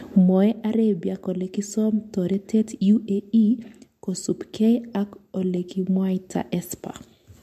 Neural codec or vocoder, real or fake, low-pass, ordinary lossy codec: none; real; 14.4 kHz; MP3, 64 kbps